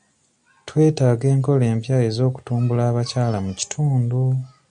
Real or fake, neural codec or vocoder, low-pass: real; none; 9.9 kHz